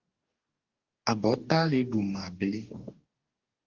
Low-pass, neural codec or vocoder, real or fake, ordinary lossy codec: 7.2 kHz; codec, 44.1 kHz, 2.6 kbps, DAC; fake; Opus, 32 kbps